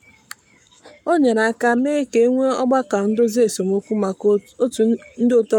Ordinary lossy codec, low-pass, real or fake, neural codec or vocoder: none; 19.8 kHz; fake; codec, 44.1 kHz, 7.8 kbps, Pupu-Codec